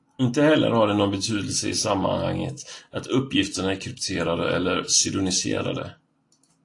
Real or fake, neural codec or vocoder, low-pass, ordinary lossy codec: real; none; 10.8 kHz; AAC, 48 kbps